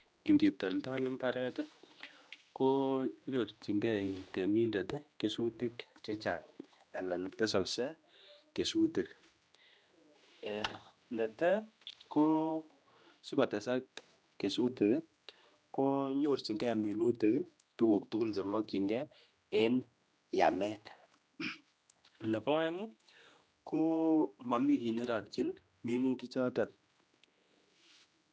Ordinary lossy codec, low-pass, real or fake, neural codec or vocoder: none; none; fake; codec, 16 kHz, 1 kbps, X-Codec, HuBERT features, trained on general audio